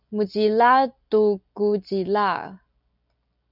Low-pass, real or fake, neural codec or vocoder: 5.4 kHz; real; none